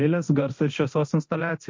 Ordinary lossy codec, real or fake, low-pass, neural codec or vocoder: MP3, 48 kbps; fake; 7.2 kHz; codec, 24 kHz, 0.9 kbps, DualCodec